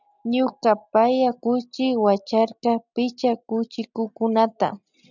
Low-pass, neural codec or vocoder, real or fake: 7.2 kHz; none; real